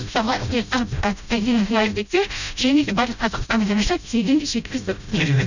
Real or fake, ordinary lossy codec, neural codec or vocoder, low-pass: fake; none; codec, 16 kHz, 0.5 kbps, FreqCodec, smaller model; 7.2 kHz